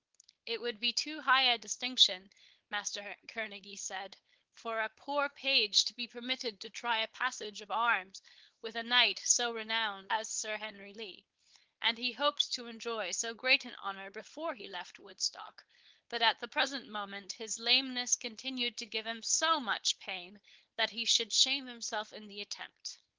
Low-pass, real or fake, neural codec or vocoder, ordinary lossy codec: 7.2 kHz; fake; codec, 16 kHz, 4.8 kbps, FACodec; Opus, 16 kbps